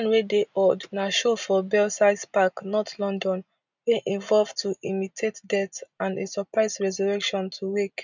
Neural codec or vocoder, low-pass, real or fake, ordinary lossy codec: none; 7.2 kHz; real; none